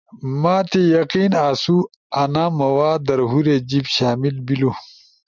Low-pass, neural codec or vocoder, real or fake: 7.2 kHz; none; real